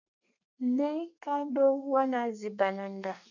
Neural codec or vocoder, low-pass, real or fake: codec, 32 kHz, 1.9 kbps, SNAC; 7.2 kHz; fake